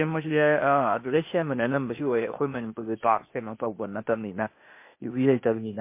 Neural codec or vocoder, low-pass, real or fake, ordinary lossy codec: codec, 16 kHz, 0.8 kbps, ZipCodec; 3.6 kHz; fake; MP3, 24 kbps